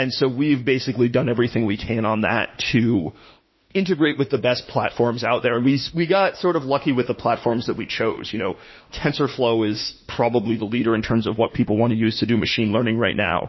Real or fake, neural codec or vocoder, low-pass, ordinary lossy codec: fake; autoencoder, 48 kHz, 32 numbers a frame, DAC-VAE, trained on Japanese speech; 7.2 kHz; MP3, 24 kbps